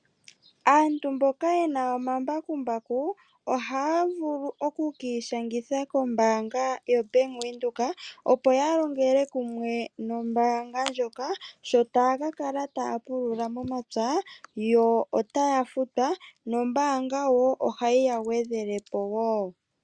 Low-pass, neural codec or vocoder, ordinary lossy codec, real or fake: 9.9 kHz; none; Opus, 64 kbps; real